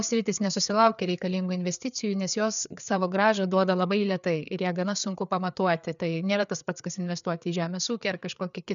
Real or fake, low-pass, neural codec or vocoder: fake; 7.2 kHz; codec, 16 kHz, 4 kbps, FreqCodec, larger model